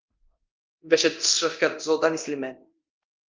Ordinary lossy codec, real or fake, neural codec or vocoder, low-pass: Opus, 24 kbps; fake; codec, 24 kHz, 0.9 kbps, DualCodec; 7.2 kHz